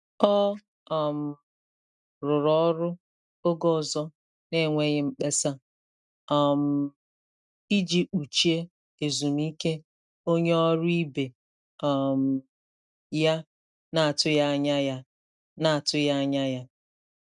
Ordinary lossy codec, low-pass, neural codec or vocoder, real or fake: none; 10.8 kHz; none; real